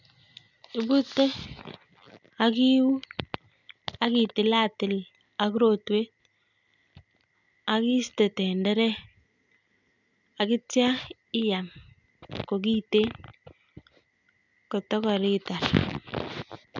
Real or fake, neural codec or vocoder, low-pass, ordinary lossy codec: real; none; 7.2 kHz; none